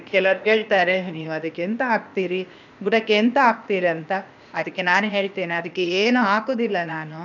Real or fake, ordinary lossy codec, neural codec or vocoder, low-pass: fake; none; codec, 16 kHz, 0.8 kbps, ZipCodec; 7.2 kHz